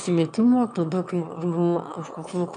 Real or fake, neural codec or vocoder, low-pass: fake; autoencoder, 22.05 kHz, a latent of 192 numbers a frame, VITS, trained on one speaker; 9.9 kHz